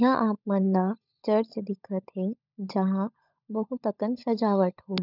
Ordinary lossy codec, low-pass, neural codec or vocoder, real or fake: none; 5.4 kHz; codec, 16 kHz, 16 kbps, FunCodec, trained on LibriTTS, 50 frames a second; fake